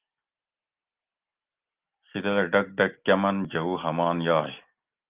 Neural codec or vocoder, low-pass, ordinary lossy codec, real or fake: none; 3.6 kHz; Opus, 32 kbps; real